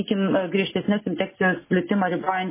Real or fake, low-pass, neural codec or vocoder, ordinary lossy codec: real; 3.6 kHz; none; MP3, 16 kbps